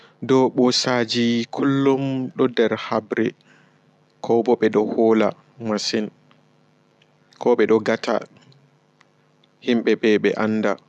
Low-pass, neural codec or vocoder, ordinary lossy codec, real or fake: none; none; none; real